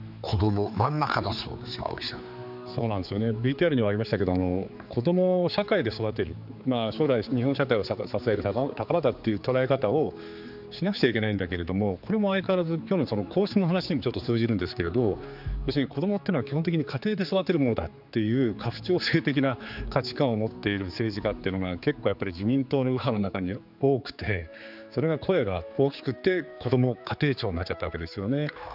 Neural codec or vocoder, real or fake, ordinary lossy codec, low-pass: codec, 16 kHz, 4 kbps, X-Codec, HuBERT features, trained on general audio; fake; none; 5.4 kHz